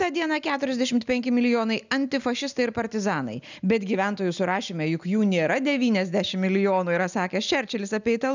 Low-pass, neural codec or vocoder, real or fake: 7.2 kHz; none; real